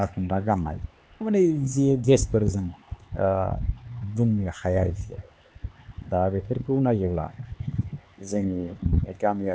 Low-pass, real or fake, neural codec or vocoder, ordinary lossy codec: none; fake; codec, 16 kHz, 4 kbps, X-Codec, HuBERT features, trained on LibriSpeech; none